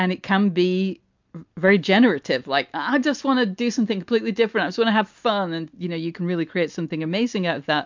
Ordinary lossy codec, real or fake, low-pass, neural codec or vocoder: MP3, 64 kbps; real; 7.2 kHz; none